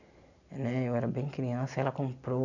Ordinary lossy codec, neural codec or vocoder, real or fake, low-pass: none; none; real; 7.2 kHz